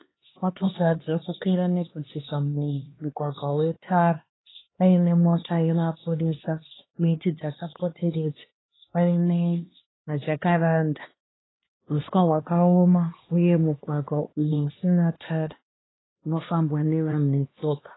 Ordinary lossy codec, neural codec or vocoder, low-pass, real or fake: AAC, 16 kbps; codec, 16 kHz, 2 kbps, X-Codec, HuBERT features, trained on LibriSpeech; 7.2 kHz; fake